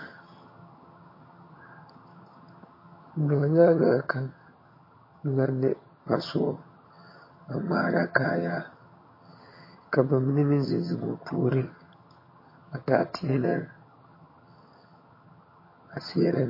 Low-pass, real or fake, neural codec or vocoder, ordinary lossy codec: 5.4 kHz; fake; vocoder, 22.05 kHz, 80 mel bands, HiFi-GAN; MP3, 24 kbps